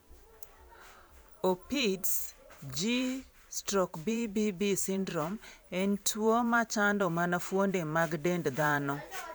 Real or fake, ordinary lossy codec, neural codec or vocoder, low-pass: fake; none; vocoder, 44.1 kHz, 128 mel bands every 512 samples, BigVGAN v2; none